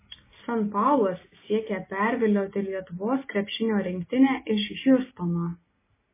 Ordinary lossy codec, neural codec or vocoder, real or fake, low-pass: MP3, 16 kbps; none; real; 3.6 kHz